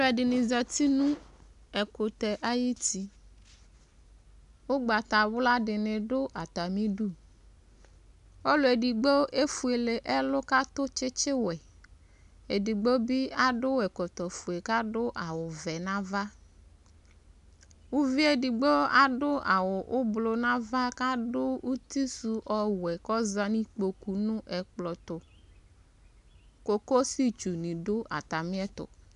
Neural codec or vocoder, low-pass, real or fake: none; 10.8 kHz; real